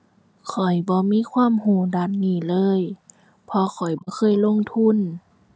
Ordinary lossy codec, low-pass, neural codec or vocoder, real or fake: none; none; none; real